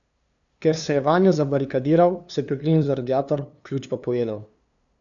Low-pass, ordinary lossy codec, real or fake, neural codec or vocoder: 7.2 kHz; none; fake; codec, 16 kHz, 2 kbps, FunCodec, trained on LibriTTS, 25 frames a second